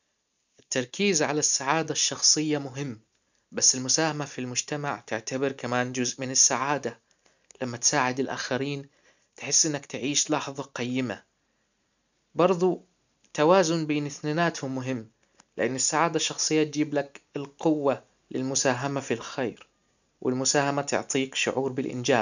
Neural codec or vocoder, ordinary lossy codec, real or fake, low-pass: none; none; real; 7.2 kHz